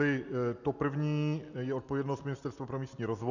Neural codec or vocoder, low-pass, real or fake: none; 7.2 kHz; real